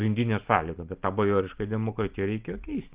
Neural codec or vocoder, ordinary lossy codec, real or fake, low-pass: none; Opus, 32 kbps; real; 3.6 kHz